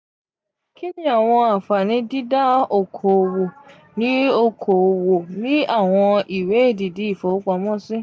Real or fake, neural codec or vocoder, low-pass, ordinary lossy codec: real; none; none; none